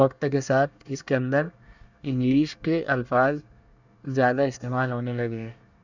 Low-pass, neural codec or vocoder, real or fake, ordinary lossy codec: 7.2 kHz; codec, 24 kHz, 1 kbps, SNAC; fake; none